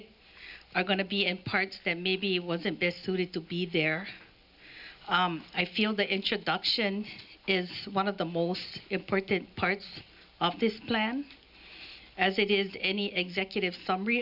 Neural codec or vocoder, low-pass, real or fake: none; 5.4 kHz; real